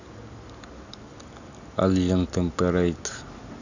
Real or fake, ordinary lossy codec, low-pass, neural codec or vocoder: real; none; 7.2 kHz; none